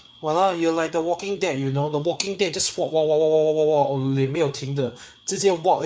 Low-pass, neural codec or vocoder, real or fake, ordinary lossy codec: none; codec, 16 kHz, 4 kbps, FreqCodec, larger model; fake; none